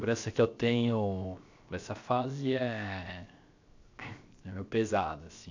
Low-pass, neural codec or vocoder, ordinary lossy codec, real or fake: 7.2 kHz; codec, 16 kHz, 0.7 kbps, FocalCodec; AAC, 48 kbps; fake